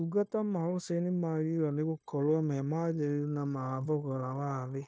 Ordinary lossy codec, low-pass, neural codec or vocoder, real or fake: none; none; codec, 16 kHz, 0.9 kbps, LongCat-Audio-Codec; fake